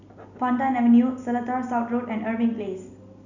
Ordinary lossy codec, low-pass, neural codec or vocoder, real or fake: none; 7.2 kHz; none; real